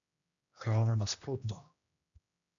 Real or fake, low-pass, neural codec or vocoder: fake; 7.2 kHz; codec, 16 kHz, 1 kbps, X-Codec, HuBERT features, trained on general audio